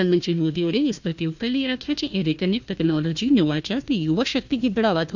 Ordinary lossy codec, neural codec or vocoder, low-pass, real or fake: none; codec, 16 kHz, 1 kbps, FunCodec, trained on Chinese and English, 50 frames a second; 7.2 kHz; fake